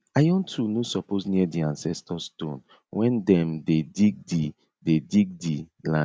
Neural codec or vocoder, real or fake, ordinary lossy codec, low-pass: none; real; none; none